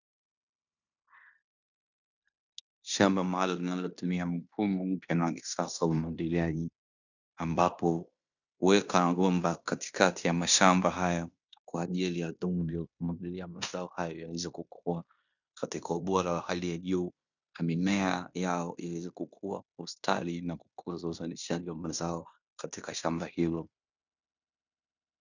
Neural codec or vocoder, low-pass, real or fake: codec, 16 kHz in and 24 kHz out, 0.9 kbps, LongCat-Audio-Codec, fine tuned four codebook decoder; 7.2 kHz; fake